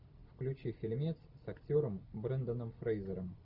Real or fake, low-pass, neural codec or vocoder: real; 5.4 kHz; none